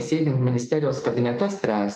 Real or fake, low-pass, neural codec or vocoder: fake; 14.4 kHz; autoencoder, 48 kHz, 32 numbers a frame, DAC-VAE, trained on Japanese speech